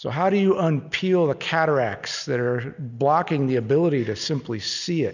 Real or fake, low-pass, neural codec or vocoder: real; 7.2 kHz; none